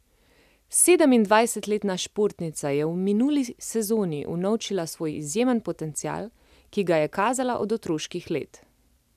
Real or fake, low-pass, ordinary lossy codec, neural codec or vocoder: real; 14.4 kHz; none; none